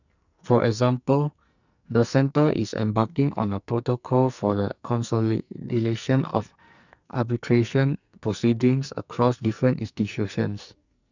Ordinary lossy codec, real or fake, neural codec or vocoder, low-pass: none; fake; codec, 44.1 kHz, 2.6 kbps, SNAC; 7.2 kHz